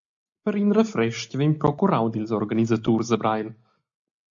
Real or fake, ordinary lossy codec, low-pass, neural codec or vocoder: real; AAC, 64 kbps; 7.2 kHz; none